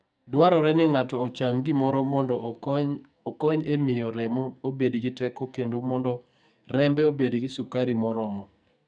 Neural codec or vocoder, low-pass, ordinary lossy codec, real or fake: codec, 44.1 kHz, 2.6 kbps, SNAC; 9.9 kHz; none; fake